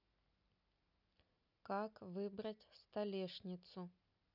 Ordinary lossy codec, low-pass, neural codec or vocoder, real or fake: none; 5.4 kHz; none; real